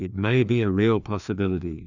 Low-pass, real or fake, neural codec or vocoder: 7.2 kHz; fake; codec, 16 kHz, 2 kbps, FreqCodec, larger model